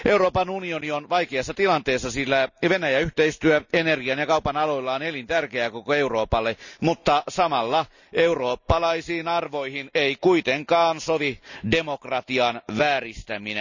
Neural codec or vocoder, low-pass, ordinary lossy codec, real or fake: none; 7.2 kHz; none; real